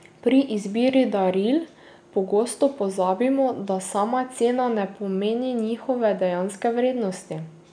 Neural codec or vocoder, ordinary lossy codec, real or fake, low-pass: none; none; real; 9.9 kHz